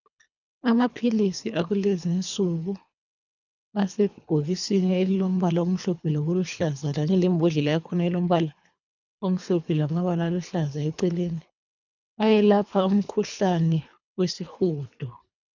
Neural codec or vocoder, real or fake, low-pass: codec, 24 kHz, 3 kbps, HILCodec; fake; 7.2 kHz